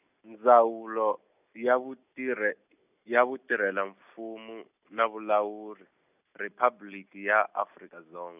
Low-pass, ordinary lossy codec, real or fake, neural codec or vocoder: 3.6 kHz; none; real; none